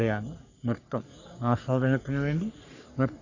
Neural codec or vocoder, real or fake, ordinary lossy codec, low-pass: codec, 44.1 kHz, 3.4 kbps, Pupu-Codec; fake; none; 7.2 kHz